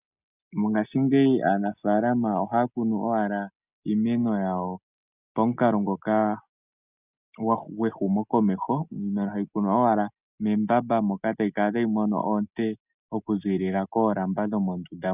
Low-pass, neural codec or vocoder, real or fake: 3.6 kHz; none; real